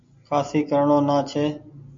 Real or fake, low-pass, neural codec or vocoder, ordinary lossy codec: real; 7.2 kHz; none; MP3, 48 kbps